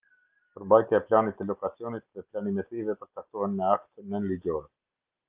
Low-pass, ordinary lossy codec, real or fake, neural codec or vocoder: 3.6 kHz; Opus, 24 kbps; real; none